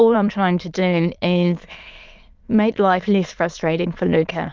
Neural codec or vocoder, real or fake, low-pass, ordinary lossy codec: autoencoder, 22.05 kHz, a latent of 192 numbers a frame, VITS, trained on many speakers; fake; 7.2 kHz; Opus, 32 kbps